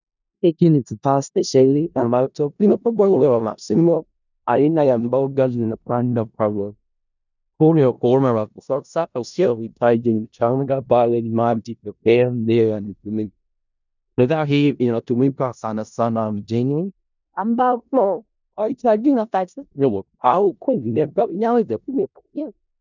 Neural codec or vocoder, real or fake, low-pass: codec, 16 kHz in and 24 kHz out, 0.4 kbps, LongCat-Audio-Codec, four codebook decoder; fake; 7.2 kHz